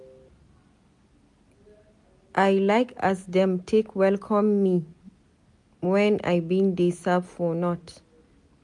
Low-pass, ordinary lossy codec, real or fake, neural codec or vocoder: 10.8 kHz; MP3, 64 kbps; real; none